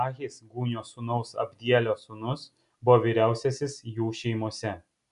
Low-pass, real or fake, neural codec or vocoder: 10.8 kHz; real; none